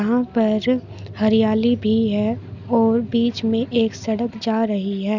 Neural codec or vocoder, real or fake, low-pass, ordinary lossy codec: none; real; 7.2 kHz; none